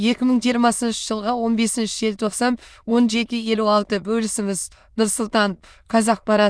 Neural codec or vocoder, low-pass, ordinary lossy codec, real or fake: autoencoder, 22.05 kHz, a latent of 192 numbers a frame, VITS, trained on many speakers; none; none; fake